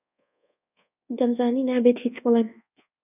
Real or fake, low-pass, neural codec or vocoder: fake; 3.6 kHz; codec, 24 kHz, 1.2 kbps, DualCodec